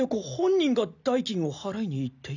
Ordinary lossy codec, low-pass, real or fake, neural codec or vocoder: none; 7.2 kHz; real; none